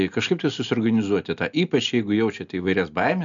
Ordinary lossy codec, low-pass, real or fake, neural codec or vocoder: MP3, 64 kbps; 7.2 kHz; real; none